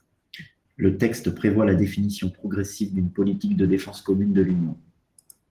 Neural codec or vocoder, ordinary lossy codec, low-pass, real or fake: none; Opus, 16 kbps; 14.4 kHz; real